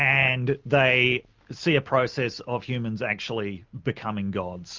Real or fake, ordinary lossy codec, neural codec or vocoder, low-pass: real; Opus, 24 kbps; none; 7.2 kHz